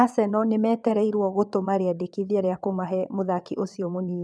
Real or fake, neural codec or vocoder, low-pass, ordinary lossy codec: fake; vocoder, 22.05 kHz, 80 mel bands, WaveNeXt; none; none